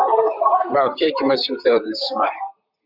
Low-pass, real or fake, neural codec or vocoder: 5.4 kHz; fake; vocoder, 44.1 kHz, 128 mel bands, Pupu-Vocoder